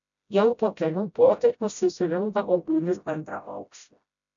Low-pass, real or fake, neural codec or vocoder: 7.2 kHz; fake; codec, 16 kHz, 0.5 kbps, FreqCodec, smaller model